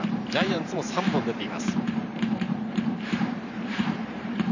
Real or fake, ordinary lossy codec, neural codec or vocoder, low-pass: fake; none; vocoder, 44.1 kHz, 128 mel bands every 512 samples, BigVGAN v2; 7.2 kHz